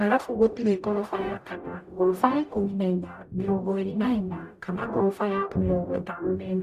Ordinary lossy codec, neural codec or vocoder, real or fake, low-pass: Opus, 64 kbps; codec, 44.1 kHz, 0.9 kbps, DAC; fake; 14.4 kHz